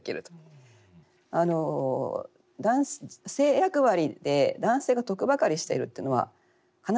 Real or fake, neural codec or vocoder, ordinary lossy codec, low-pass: real; none; none; none